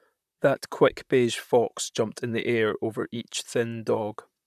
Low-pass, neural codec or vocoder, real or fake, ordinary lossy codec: 14.4 kHz; vocoder, 44.1 kHz, 128 mel bands, Pupu-Vocoder; fake; none